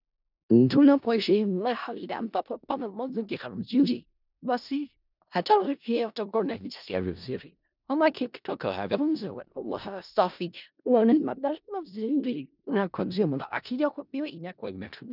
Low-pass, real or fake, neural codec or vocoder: 5.4 kHz; fake; codec, 16 kHz in and 24 kHz out, 0.4 kbps, LongCat-Audio-Codec, four codebook decoder